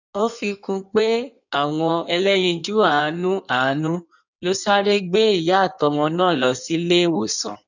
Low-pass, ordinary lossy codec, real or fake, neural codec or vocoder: 7.2 kHz; none; fake; codec, 16 kHz in and 24 kHz out, 1.1 kbps, FireRedTTS-2 codec